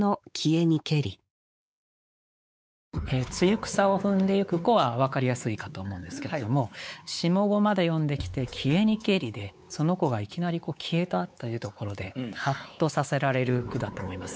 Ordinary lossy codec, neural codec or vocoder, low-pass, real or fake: none; codec, 16 kHz, 4 kbps, X-Codec, WavLM features, trained on Multilingual LibriSpeech; none; fake